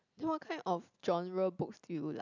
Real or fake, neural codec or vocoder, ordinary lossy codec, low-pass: real; none; none; 7.2 kHz